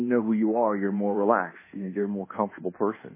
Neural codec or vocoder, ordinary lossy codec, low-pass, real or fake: codec, 24 kHz, 1.2 kbps, DualCodec; MP3, 16 kbps; 3.6 kHz; fake